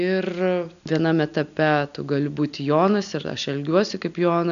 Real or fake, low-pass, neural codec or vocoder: real; 7.2 kHz; none